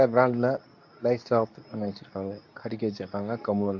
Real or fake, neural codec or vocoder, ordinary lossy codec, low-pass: fake; codec, 24 kHz, 0.9 kbps, WavTokenizer, medium speech release version 2; none; 7.2 kHz